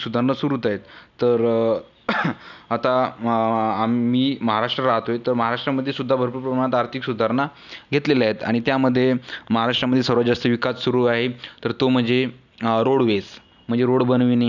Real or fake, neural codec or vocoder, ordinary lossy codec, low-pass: real; none; none; 7.2 kHz